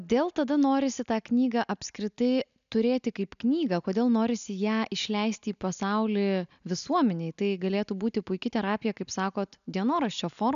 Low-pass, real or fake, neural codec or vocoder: 7.2 kHz; real; none